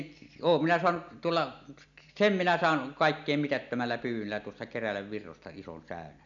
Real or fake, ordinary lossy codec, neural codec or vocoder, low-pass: real; none; none; 7.2 kHz